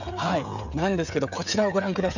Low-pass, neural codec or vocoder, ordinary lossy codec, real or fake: 7.2 kHz; codec, 16 kHz, 16 kbps, FreqCodec, smaller model; none; fake